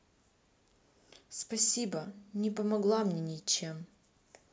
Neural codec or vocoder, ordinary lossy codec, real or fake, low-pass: none; none; real; none